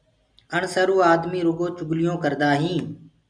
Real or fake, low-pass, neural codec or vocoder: real; 9.9 kHz; none